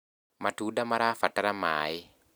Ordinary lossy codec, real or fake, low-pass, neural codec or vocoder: none; real; none; none